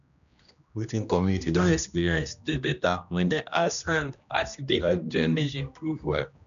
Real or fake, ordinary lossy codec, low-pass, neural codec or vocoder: fake; none; 7.2 kHz; codec, 16 kHz, 1 kbps, X-Codec, HuBERT features, trained on general audio